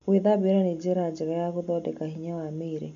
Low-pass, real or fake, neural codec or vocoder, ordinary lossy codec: 7.2 kHz; real; none; none